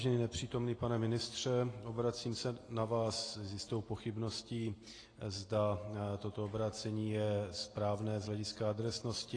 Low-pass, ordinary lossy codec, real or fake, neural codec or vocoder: 9.9 kHz; AAC, 32 kbps; real; none